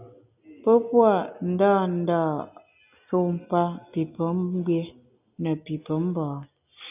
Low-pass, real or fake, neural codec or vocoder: 3.6 kHz; real; none